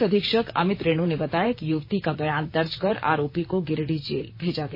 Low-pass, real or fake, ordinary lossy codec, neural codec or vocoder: 5.4 kHz; fake; MP3, 24 kbps; vocoder, 44.1 kHz, 128 mel bands, Pupu-Vocoder